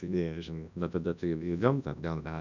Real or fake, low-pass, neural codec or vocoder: fake; 7.2 kHz; codec, 24 kHz, 0.9 kbps, WavTokenizer, large speech release